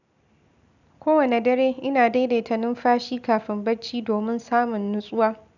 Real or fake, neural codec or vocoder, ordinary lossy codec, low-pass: real; none; none; 7.2 kHz